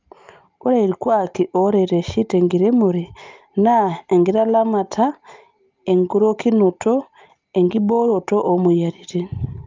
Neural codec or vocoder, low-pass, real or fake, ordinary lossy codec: none; 7.2 kHz; real; Opus, 24 kbps